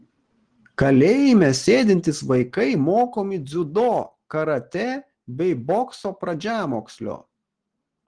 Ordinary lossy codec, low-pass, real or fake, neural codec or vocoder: Opus, 16 kbps; 9.9 kHz; real; none